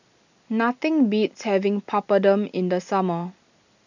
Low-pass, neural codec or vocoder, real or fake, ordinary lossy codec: 7.2 kHz; none; real; none